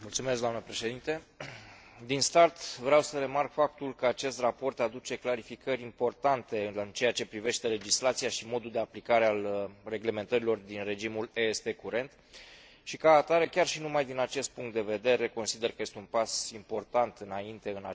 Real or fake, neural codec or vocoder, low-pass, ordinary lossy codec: real; none; none; none